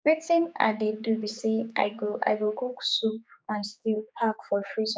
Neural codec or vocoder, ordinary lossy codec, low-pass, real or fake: codec, 16 kHz, 4 kbps, X-Codec, HuBERT features, trained on general audio; none; none; fake